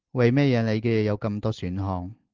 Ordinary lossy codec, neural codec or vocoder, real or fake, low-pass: Opus, 16 kbps; none; real; 7.2 kHz